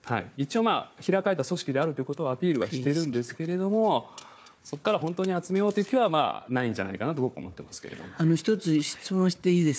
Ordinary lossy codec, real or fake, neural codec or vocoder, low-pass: none; fake; codec, 16 kHz, 4 kbps, FunCodec, trained on Chinese and English, 50 frames a second; none